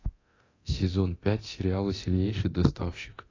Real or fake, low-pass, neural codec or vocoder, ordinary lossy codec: fake; 7.2 kHz; codec, 24 kHz, 0.9 kbps, DualCodec; AAC, 32 kbps